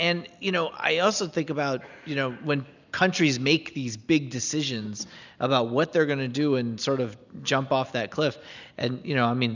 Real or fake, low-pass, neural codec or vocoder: real; 7.2 kHz; none